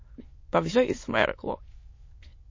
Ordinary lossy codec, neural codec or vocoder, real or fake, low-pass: MP3, 32 kbps; autoencoder, 22.05 kHz, a latent of 192 numbers a frame, VITS, trained on many speakers; fake; 7.2 kHz